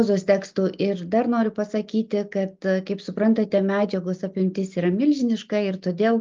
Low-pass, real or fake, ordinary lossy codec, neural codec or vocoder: 7.2 kHz; real; Opus, 24 kbps; none